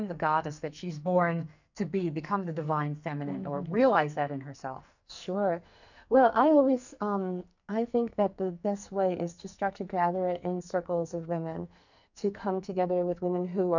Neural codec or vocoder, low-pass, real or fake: codec, 44.1 kHz, 2.6 kbps, SNAC; 7.2 kHz; fake